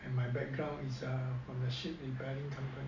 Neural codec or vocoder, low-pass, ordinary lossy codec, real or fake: none; 7.2 kHz; MP3, 64 kbps; real